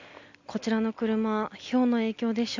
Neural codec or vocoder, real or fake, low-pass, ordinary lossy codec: none; real; 7.2 kHz; MP3, 64 kbps